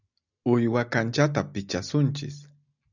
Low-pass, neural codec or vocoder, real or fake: 7.2 kHz; none; real